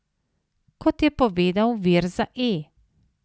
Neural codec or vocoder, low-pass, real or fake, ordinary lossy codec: none; none; real; none